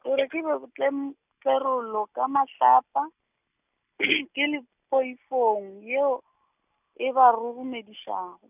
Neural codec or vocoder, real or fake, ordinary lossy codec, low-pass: none; real; none; 3.6 kHz